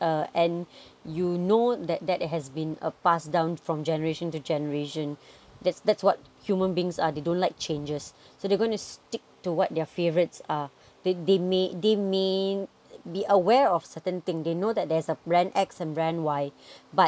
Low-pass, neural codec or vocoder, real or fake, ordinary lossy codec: none; none; real; none